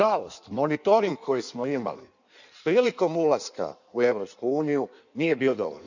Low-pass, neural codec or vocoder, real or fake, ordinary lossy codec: 7.2 kHz; codec, 16 kHz in and 24 kHz out, 1.1 kbps, FireRedTTS-2 codec; fake; none